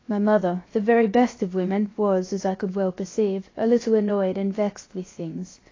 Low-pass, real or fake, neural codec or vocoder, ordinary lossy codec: 7.2 kHz; fake; codec, 16 kHz, 0.7 kbps, FocalCodec; AAC, 32 kbps